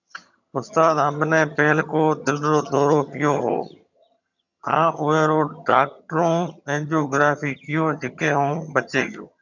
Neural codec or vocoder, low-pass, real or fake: vocoder, 22.05 kHz, 80 mel bands, HiFi-GAN; 7.2 kHz; fake